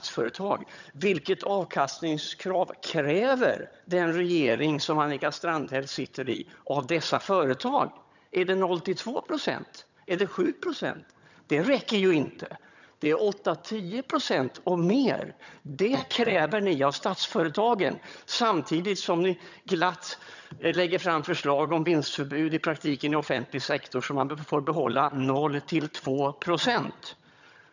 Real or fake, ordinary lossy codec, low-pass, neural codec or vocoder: fake; none; 7.2 kHz; vocoder, 22.05 kHz, 80 mel bands, HiFi-GAN